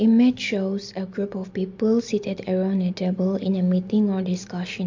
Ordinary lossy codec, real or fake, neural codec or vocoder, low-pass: MP3, 48 kbps; real; none; 7.2 kHz